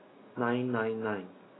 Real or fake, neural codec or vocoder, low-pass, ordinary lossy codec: real; none; 7.2 kHz; AAC, 16 kbps